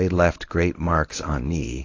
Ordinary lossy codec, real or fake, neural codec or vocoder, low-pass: AAC, 32 kbps; fake; codec, 24 kHz, 0.9 kbps, WavTokenizer, medium speech release version 1; 7.2 kHz